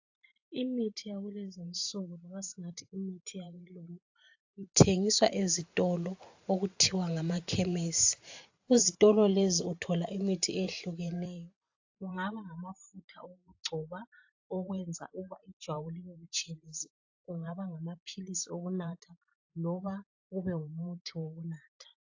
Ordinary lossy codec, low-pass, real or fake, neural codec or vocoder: AAC, 48 kbps; 7.2 kHz; fake; vocoder, 44.1 kHz, 128 mel bands every 512 samples, BigVGAN v2